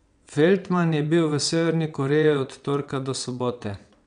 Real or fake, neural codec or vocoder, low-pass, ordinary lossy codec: fake; vocoder, 22.05 kHz, 80 mel bands, WaveNeXt; 9.9 kHz; none